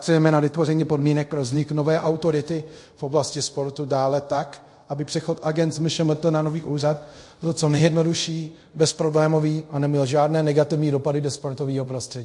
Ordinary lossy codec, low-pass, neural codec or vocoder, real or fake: MP3, 48 kbps; 10.8 kHz; codec, 24 kHz, 0.5 kbps, DualCodec; fake